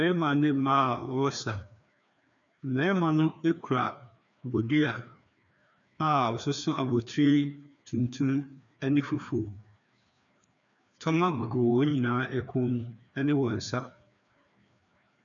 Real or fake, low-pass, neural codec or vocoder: fake; 7.2 kHz; codec, 16 kHz, 2 kbps, FreqCodec, larger model